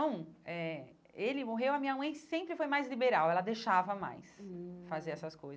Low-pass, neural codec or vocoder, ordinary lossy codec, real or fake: none; none; none; real